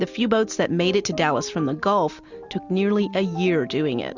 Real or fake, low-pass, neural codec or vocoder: real; 7.2 kHz; none